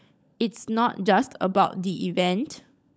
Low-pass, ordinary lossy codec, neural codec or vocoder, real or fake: none; none; codec, 16 kHz, 16 kbps, FunCodec, trained on LibriTTS, 50 frames a second; fake